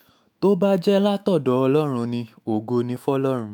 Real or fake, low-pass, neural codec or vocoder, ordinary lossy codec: fake; none; autoencoder, 48 kHz, 128 numbers a frame, DAC-VAE, trained on Japanese speech; none